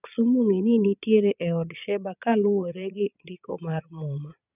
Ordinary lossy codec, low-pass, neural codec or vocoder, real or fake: none; 3.6 kHz; vocoder, 22.05 kHz, 80 mel bands, Vocos; fake